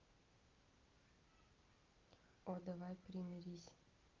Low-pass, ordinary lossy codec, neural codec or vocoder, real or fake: 7.2 kHz; Opus, 24 kbps; none; real